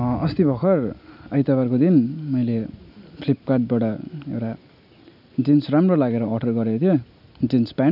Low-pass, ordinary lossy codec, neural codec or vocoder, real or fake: 5.4 kHz; none; none; real